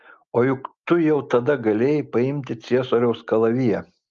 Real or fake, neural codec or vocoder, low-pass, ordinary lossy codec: real; none; 7.2 kHz; Opus, 32 kbps